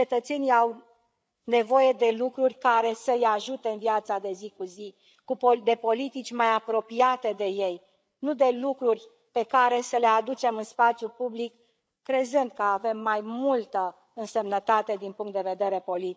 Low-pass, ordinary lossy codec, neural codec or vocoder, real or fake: none; none; codec, 16 kHz, 8 kbps, FreqCodec, larger model; fake